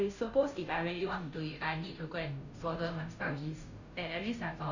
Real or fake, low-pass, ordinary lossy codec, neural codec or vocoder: fake; 7.2 kHz; none; codec, 16 kHz, 0.5 kbps, FunCodec, trained on Chinese and English, 25 frames a second